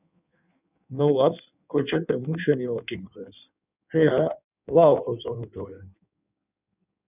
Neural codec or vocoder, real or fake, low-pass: codec, 16 kHz in and 24 kHz out, 1.1 kbps, FireRedTTS-2 codec; fake; 3.6 kHz